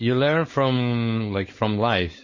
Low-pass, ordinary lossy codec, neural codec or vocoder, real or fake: 7.2 kHz; MP3, 32 kbps; codec, 16 kHz, 4.8 kbps, FACodec; fake